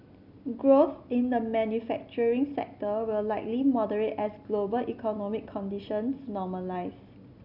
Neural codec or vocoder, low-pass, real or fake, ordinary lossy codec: none; 5.4 kHz; real; none